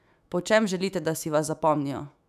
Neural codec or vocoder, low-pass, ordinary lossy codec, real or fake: autoencoder, 48 kHz, 128 numbers a frame, DAC-VAE, trained on Japanese speech; 14.4 kHz; none; fake